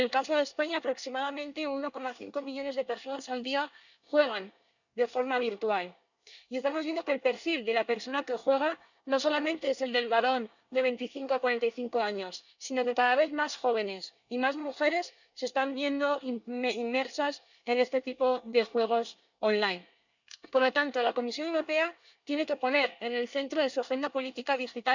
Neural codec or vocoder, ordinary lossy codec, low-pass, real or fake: codec, 24 kHz, 1 kbps, SNAC; none; 7.2 kHz; fake